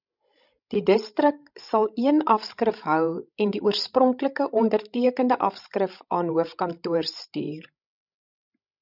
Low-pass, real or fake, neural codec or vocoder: 5.4 kHz; fake; codec, 16 kHz, 16 kbps, FreqCodec, larger model